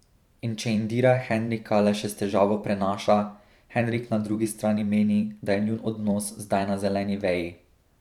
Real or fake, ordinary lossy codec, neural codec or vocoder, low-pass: fake; none; vocoder, 44.1 kHz, 128 mel bands every 512 samples, BigVGAN v2; 19.8 kHz